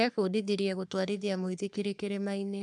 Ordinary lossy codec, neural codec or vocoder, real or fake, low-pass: none; codec, 44.1 kHz, 3.4 kbps, Pupu-Codec; fake; 10.8 kHz